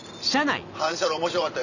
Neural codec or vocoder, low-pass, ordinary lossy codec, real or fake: none; 7.2 kHz; none; real